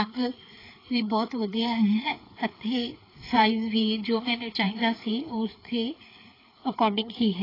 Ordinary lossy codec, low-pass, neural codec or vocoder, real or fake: AAC, 24 kbps; 5.4 kHz; codec, 16 kHz, 4 kbps, FreqCodec, larger model; fake